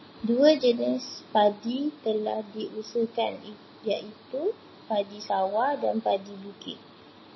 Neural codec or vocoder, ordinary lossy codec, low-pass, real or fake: none; MP3, 24 kbps; 7.2 kHz; real